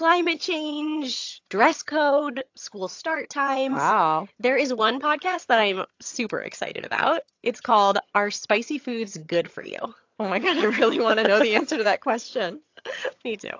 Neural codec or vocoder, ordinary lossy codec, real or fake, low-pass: vocoder, 22.05 kHz, 80 mel bands, HiFi-GAN; AAC, 48 kbps; fake; 7.2 kHz